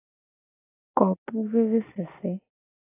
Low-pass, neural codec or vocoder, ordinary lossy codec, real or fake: 3.6 kHz; none; AAC, 16 kbps; real